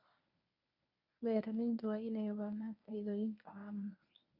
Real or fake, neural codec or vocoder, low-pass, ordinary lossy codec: fake; codec, 24 kHz, 0.9 kbps, WavTokenizer, medium speech release version 1; 5.4 kHz; none